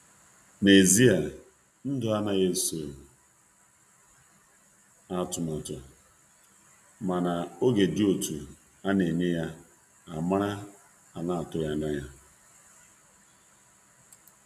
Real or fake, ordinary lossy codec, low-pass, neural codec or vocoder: real; none; 14.4 kHz; none